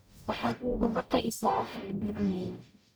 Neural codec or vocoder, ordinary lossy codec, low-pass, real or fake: codec, 44.1 kHz, 0.9 kbps, DAC; none; none; fake